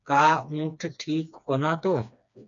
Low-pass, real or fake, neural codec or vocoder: 7.2 kHz; fake; codec, 16 kHz, 2 kbps, FreqCodec, smaller model